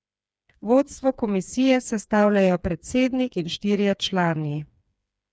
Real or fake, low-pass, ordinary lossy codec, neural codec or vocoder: fake; none; none; codec, 16 kHz, 4 kbps, FreqCodec, smaller model